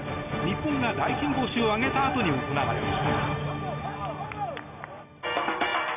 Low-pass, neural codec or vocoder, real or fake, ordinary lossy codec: 3.6 kHz; none; real; none